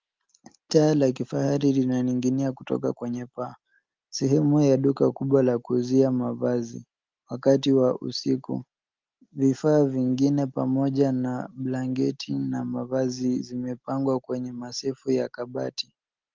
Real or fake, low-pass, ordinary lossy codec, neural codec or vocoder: real; 7.2 kHz; Opus, 32 kbps; none